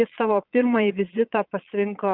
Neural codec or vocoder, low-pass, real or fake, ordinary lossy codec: vocoder, 22.05 kHz, 80 mel bands, Vocos; 5.4 kHz; fake; Opus, 64 kbps